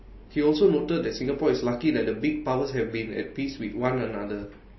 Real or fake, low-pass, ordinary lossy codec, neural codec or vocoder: real; 7.2 kHz; MP3, 24 kbps; none